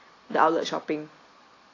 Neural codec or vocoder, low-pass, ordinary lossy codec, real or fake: none; 7.2 kHz; AAC, 32 kbps; real